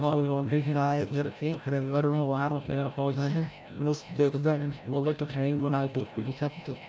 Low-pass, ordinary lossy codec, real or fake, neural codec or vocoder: none; none; fake; codec, 16 kHz, 0.5 kbps, FreqCodec, larger model